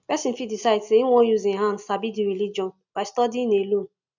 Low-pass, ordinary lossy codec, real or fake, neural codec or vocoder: 7.2 kHz; none; real; none